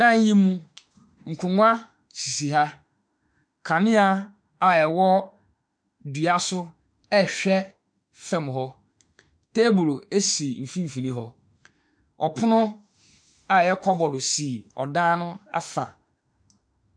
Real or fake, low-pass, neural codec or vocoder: fake; 9.9 kHz; autoencoder, 48 kHz, 32 numbers a frame, DAC-VAE, trained on Japanese speech